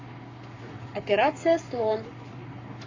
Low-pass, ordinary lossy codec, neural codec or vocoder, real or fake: 7.2 kHz; MP3, 64 kbps; codec, 44.1 kHz, 7.8 kbps, Pupu-Codec; fake